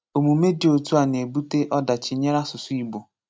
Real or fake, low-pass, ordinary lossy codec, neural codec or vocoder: real; none; none; none